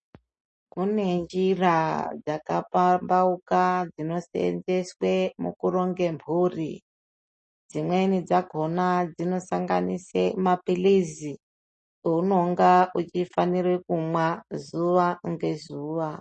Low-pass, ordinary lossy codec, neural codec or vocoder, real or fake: 9.9 kHz; MP3, 32 kbps; none; real